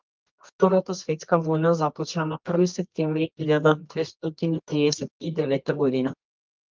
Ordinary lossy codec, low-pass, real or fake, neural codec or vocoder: Opus, 32 kbps; 7.2 kHz; fake; codec, 24 kHz, 0.9 kbps, WavTokenizer, medium music audio release